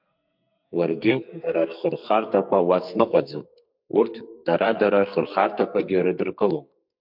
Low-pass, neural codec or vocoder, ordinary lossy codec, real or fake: 5.4 kHz; codec, 44.1 kHz, 2.6 kbps, SNAC; MP3, 48 kbps; fake